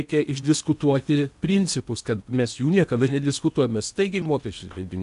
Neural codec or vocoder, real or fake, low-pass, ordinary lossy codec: codec, 16 kHz in and 24 kHz out, 0.8 kbps, FocalCodec, streaming, 65536 codes; fake; 10.8 kHz; MP3, 96 kbps